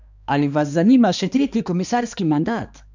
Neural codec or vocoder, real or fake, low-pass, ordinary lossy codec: codec, 16 kHz, 2 kbps, X-Codec, HuBERT features, trained on balanced general audio; fake; 7.2 kHz; none